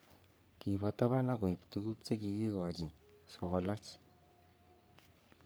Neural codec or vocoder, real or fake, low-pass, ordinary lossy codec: codec, 44.1 kHz, 3.4 kbps, Pupu-Codec; fake; none; none